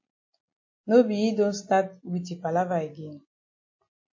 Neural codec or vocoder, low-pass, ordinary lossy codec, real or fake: none; 7.2 kHz; MP3, 32 kbps; real